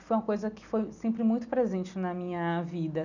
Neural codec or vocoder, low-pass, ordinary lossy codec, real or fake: none; 7.2 kHz; none; real